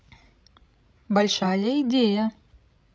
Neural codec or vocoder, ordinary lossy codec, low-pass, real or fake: codec, 16 kHz, 16 kbps, FreqCodec, larger model; none; none; fake